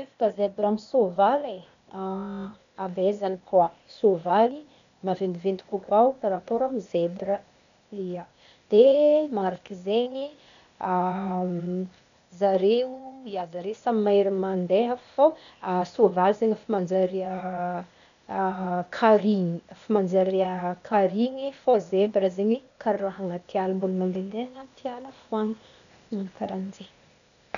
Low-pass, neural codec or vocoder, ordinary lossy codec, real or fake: 7.2 kHz; codec, 16 kHz, 0.8 kbps, ZipCodec; none; fake